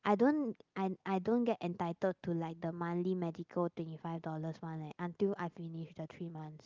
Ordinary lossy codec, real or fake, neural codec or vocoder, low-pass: Opus, 24 kbps; real; none; 7.2 kHz